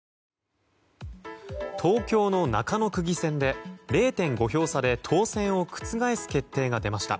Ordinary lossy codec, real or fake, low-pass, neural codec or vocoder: none; real; none; none